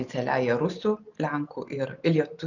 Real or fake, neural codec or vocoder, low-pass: real; none; 7.2 kHz